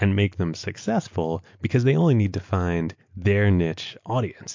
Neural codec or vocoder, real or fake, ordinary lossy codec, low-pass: none; real; MP3, 48 kbps; 7.2 kHz